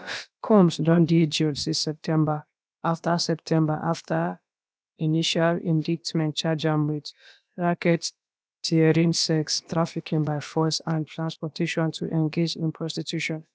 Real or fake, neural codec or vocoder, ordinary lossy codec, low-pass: fake; codec, 16 kHz, about 1 kbps, DyCAST, with the encoder's durations; none; none